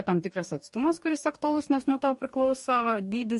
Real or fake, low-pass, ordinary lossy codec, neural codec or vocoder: fake; 14.4 kHz; MP3, 48 kbps; codec, 44.1 kHz, 2.6 kbps, DAC